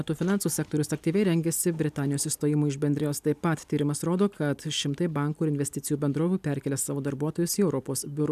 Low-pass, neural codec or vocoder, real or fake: 14.4 kHz; none; real